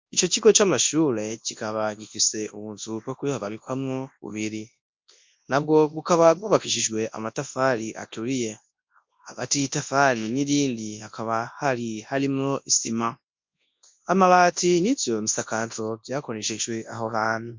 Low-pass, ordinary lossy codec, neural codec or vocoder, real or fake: 7.2 kHz; MP3, 48 kbps; codec, 24 kHz, 0.9 kbps, WavTokenizer, large speech release; fake